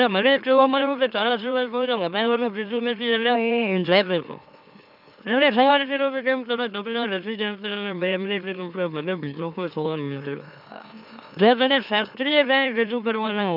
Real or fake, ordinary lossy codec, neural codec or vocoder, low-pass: fake; none; autoencoder, 44.1 kHz, a latent of 192 numbers a frame, MeloTTS; 5.4 kHz